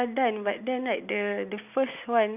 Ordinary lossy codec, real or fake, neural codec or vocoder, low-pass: none; real; none; 3.6 kHz